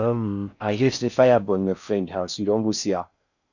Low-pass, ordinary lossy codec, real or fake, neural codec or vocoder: 7.2 kHz; none; fake; codec, 16 kHz in and 24 kHz out, 0.6 kbps, FocalCodec, streaming, 4096 codes